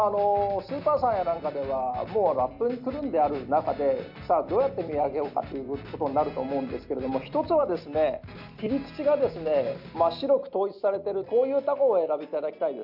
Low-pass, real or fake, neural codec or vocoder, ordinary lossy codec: 5.4 kHz; real; none; none